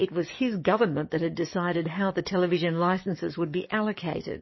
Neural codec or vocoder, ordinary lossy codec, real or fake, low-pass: codec, 44.1 kHz, 7.8 kbps, DAC; MP3, 24 kbps; fake; 7.2 kHz